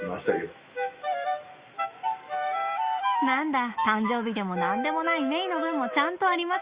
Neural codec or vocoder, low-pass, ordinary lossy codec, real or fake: autoencoder, 48 kHz, 128 numbers a frame, DAC-VAE, trained on Japanese speech; 3.6 kHz; Opus, 64 kbps; fake